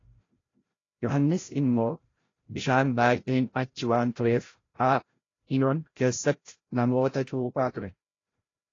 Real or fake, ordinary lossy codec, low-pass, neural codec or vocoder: fake; AAC, 32 kbps; 7.2 kHz; codec, 16 kHz, 0.5 kbps, FreqCodec, larger model